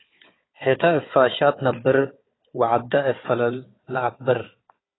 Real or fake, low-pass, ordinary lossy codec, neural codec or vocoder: fake; 7.2 kHz; AAC, 16 kbps; codec, 16 kHz, 4 kbps, FunCodec, trained on Chinese and English, 50 frames a second